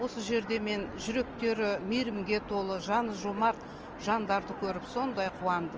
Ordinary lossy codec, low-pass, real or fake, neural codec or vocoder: Opus, 24 kbps; 7.2 kHz; real; none